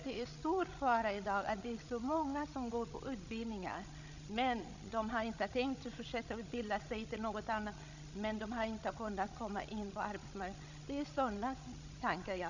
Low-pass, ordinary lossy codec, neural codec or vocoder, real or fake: 7.2 kHz; none; codec, 16 kHz, 16 kbps, FreqCodec, larger model; fake